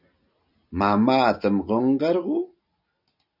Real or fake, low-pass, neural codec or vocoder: real; 5.4 kHz; none